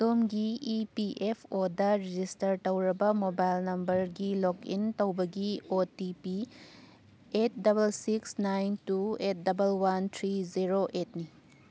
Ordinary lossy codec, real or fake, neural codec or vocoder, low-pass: none; real; none; none